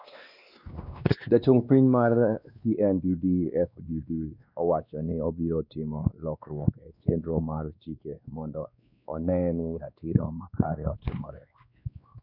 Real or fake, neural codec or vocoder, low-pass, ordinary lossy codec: fake; codec, 16 kHz, 2 kbps, X-Codec, WavLM features, trained on Multilingual LibriSpeech; 5.4 kHz; none